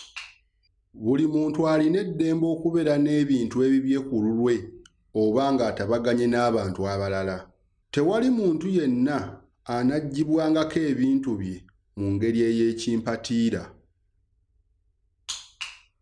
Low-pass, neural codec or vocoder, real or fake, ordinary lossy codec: 9.9 kHz; none; real; none